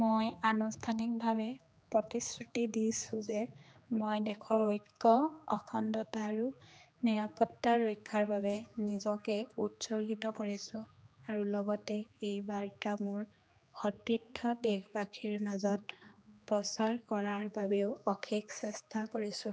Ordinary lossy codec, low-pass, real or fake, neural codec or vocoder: none; none; fake; codec, 16 kHz, 2 kbps, X-Codec, HuBERT features, trained on general audio